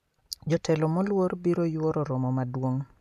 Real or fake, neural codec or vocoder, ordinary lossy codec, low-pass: real; none; none; 14.4 kHz